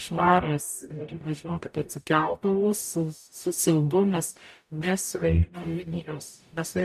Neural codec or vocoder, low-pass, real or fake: codec, 44.1 kHz, 0.9 kbps, DAC; 14.4 kHz; fake